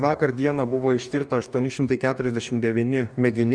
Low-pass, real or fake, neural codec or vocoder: 9.9 kHz; fake; codec, 44.1 kHz, 2.6 kbps, DAC